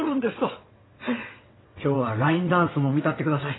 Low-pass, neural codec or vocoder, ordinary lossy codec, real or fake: 7.2 kHz; vocoder, 22.05 kHz, 80 mel bands, WaveNeXt; AAC, 16 kbps; fake